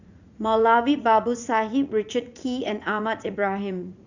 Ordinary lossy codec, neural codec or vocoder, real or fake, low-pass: none; vocoder, 44.1 kHz, 80 mel bands, Vocos; fake; 7.2 kHz